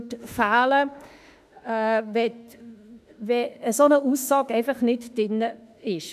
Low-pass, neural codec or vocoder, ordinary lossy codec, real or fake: 14.4 kHz; autoencoder, 48 kHz, 32 numbers a frame, DAC-VAE, trained on Japanese speech; none; fake